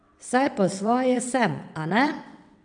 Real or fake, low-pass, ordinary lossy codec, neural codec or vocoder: fake; 9.9 kHz; MP3, 96 kbps; vocoder, 22.05 kHz, 80 mel bands, WaveNeXt